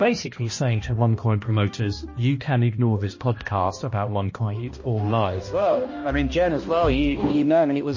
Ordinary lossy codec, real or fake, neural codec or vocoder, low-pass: MP3, 32 kbps; fake; codec, 16 kHz, 1 kbps, X-Codec, HuBERT features, trained on balanced general audio; 7.2 kHz